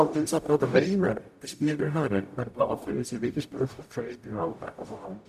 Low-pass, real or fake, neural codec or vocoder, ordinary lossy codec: 14.4 kHz; fake; codec, 44.1 kHz, 0.9 kbps, DAC; none